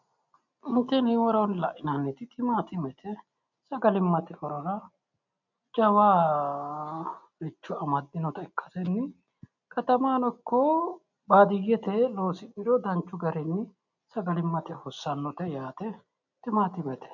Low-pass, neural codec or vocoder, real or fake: 7.2 kHz; none; real